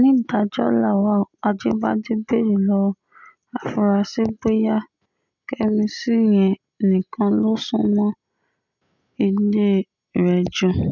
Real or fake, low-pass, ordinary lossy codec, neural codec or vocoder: real; 7.2 kHz; none; none